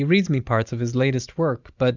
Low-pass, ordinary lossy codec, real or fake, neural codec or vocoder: 7.2 kHz; Opus, 64 kbps; real; none